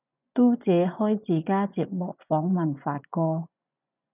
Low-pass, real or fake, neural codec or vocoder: 3.6 kHz; fake; vocoder, 24 kHz, 100 mel bands, Vocos